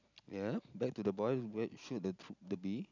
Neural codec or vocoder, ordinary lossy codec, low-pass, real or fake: none; none; 7.2 kHz; real